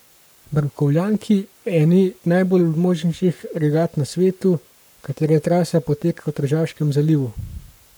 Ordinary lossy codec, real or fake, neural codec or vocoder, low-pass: none; fake; codec, 44.1 kHz, 7.8 kbps, Pupu-Codec; none